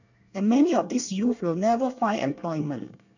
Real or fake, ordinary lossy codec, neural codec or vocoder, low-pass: fake; none; codec, 24 kHz, 1 kbps, SNAC; 7.2 kHz